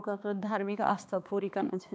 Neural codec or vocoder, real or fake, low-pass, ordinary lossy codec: codec, 16 kHz, 2 kbps, X-Codec, HuBERT features, trained on balanced general audio; fake; none; none